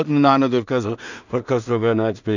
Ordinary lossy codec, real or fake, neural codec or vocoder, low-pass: none; fake; codec, 16 kHz in and 24 kHz out, 0.4 kbps, LongCat-Audio-Codec, two codebook decoder; 7.2 kHz